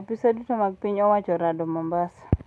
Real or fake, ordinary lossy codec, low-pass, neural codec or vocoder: real; none; none; none